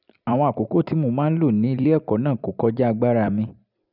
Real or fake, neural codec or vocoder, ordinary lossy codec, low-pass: fake; vocoder, 44.1 kHz, 128 mel bands every 256 samples, BigVGAN v2; none; 5.4 kHz